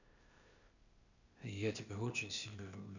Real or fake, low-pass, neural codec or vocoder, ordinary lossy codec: fake; 7.2 kHz; codec, 16 kHz, 0.8 kbps, ZipCodec; none